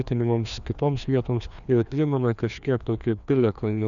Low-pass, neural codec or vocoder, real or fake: 7.2 kHz; codec, 16 kHz, 2 kbps, FreqCodec, larger model; fake